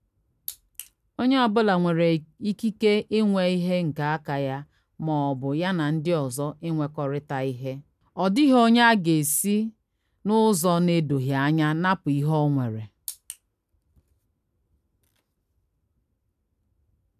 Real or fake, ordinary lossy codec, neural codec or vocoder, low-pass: real; none; none; 14.4 kHz